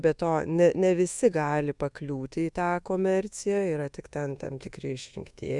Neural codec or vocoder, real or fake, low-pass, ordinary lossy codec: codec, 24 kHz, 1.2 kbps, DualCodec; fake; 10.8 kHz; Opus, 64 kbps